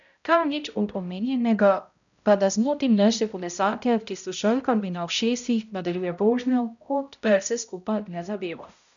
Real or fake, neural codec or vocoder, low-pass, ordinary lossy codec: fake; codec, 16 kHz, 0.5 kbps, X-Codec, HuBERT features, trained on balanced general audio; 7.2 kHz; none